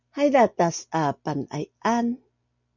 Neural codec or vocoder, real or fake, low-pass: none; real; 7.2 kHz